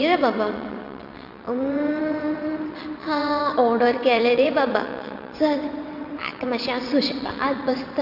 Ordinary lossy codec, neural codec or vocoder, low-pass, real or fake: none; vocoder, 22.05 kHz, 80 mel bands, WaveNeXt; 5.4 kHz; fake